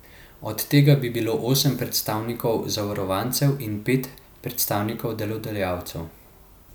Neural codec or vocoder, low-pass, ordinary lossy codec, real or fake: none; none; none; real